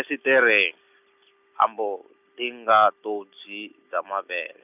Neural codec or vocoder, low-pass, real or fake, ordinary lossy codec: none; 3.6 kHz; real; none